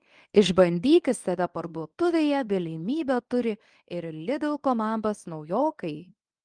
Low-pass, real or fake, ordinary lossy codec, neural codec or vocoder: 9.9 kHz; fake; Opus, 32 kbps; codec, 24 kHz, 0.9 kbps, WavTokenizer, medium speech release version 1